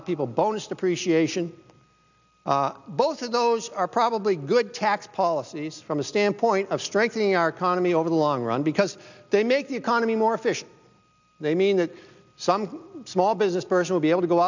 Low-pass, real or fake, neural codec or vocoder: 7.2 kHz; real; none